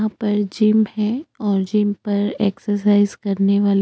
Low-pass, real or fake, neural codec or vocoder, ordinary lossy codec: none; real; none; none